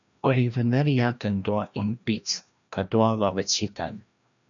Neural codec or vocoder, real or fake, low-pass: codec, 16 kHz, 1 kbps, FreqCodec, larger model; fake; 7.2 kHz